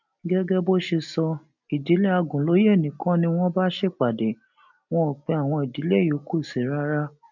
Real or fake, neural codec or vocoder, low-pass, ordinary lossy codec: real; none; 7.2 kHz; none